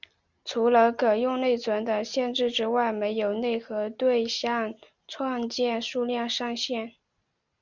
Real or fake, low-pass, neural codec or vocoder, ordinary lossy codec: real; 7.2 kHz; none; Opus, 64 kbps